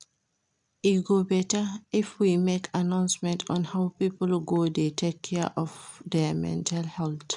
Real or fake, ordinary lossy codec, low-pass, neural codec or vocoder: real; none; 10.8 kHz; none